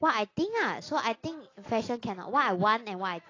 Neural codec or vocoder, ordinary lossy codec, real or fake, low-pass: none; AAC, 32 kbps; real; 7.2 kHz